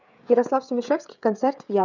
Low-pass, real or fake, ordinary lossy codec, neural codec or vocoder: 7.2 kHz; fake; none; codec, 16 kHz, 16 kbps, FreqCodec, smaller model